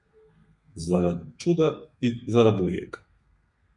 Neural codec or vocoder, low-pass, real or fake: codec, 32 kHz, 1.9 kbps, SNAC; 10.8 kHz; fake